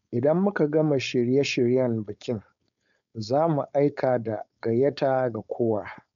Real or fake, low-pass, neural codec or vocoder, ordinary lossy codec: fake; 7.2 kHz; codec, 16 kHz, 4.8 kbps, FACodec; none